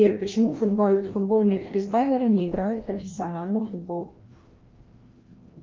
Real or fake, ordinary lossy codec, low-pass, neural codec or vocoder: fake; Opus, 32 kbps; 7.2 kHz; codec, 16 kHz, 1 kbps, FreqCodec, larger model